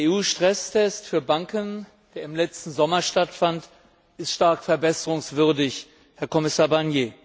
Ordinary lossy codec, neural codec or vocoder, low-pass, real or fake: none; none; none; real